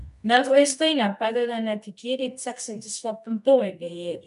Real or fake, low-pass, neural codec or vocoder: fake; 10.8 kHz; codec, 24 kHz, 0.9 kbps, WavTokenizer, medium music audio release